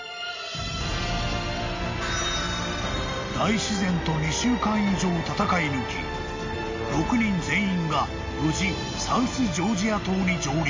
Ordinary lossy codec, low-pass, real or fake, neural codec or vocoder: MP3, 48 kbps; 7.2 kHz; real; none